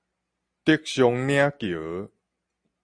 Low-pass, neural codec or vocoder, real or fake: 9.9 kHz; none; real